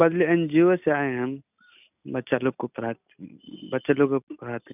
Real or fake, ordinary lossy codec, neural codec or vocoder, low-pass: real; none; none; 3.6 kHz